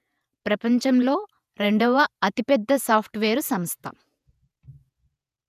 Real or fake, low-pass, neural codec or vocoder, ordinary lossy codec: fake; 14.4 kHz; vocoder, 48 kHz, 128 mel bands, Vocos; none